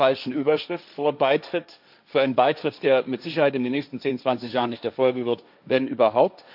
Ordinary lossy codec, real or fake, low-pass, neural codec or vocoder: none; fake; 5.4 kHz; codec, 16 kHz, 1.1 kbps, Voila-Tokenizer